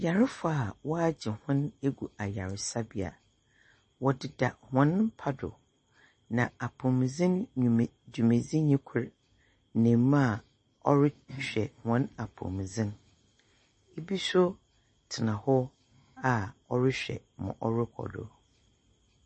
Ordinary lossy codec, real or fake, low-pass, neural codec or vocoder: MP3, 32 kbps; real; 10.8 kHz; none